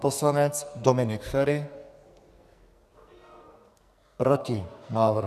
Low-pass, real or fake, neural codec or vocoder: 14.4 kHz; fake; codec, 44.1 kHz, 2.6 kbps, SNAC